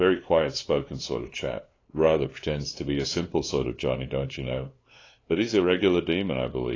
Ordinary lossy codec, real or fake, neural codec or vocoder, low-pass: AAC, 32 kbps; fake; codec, 16 kHz, 2 kbps, FunCodec, trained on LibriTTS, 25 frames a second; 7.2 kHz